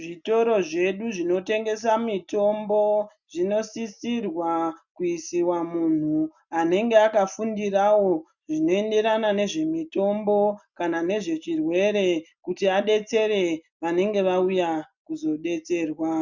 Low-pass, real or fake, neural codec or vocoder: 7.2 kHz; real; none